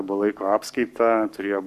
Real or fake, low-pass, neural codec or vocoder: fake; 14.4 kHz; codec, 44.1 kHz, 7.8 kbps, Pupu-Codec